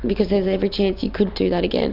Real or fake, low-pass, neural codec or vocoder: real; 5.4 kHz; none